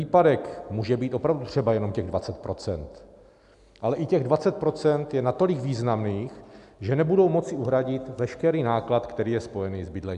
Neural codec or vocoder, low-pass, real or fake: none; 10.8 kHz; real